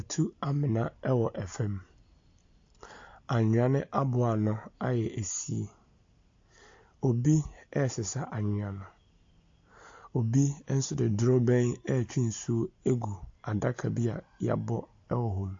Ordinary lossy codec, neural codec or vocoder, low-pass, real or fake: AAC, 48 kbps; none; 7.2 kHz; real